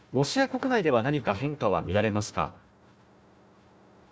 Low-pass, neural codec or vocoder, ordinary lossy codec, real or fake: none; codec, 16 kHz, 1 kbps, FunCodec, trained on Chinese and English, 50 frames a second; none; fake